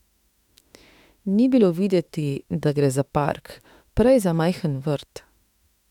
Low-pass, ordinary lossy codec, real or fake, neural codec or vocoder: 19.8 kHz; none; fake; autoencoder, 48 kHz, 32 numbers a frame, DAC-VAE, trained on Japanese speech